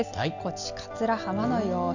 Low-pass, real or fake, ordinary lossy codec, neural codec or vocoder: 7.2 kHz; real; none; none